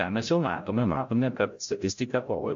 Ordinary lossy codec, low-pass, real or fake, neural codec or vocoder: MP3, 48 kbps; 7.2 kHz; fake; codec, 16 kHz, 0.5 kbps, FreqCodec, larger model